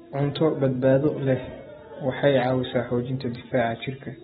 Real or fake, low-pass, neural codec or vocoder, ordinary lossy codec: real; 7.2 kHz; none; AAC, 16 kbps